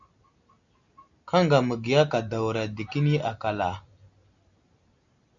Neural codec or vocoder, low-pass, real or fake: none; 7.2 kHz; real